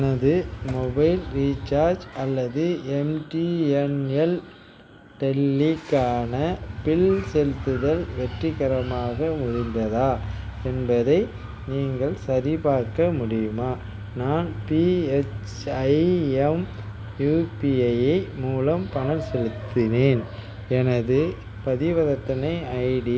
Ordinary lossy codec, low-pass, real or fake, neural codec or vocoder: none; none; real; none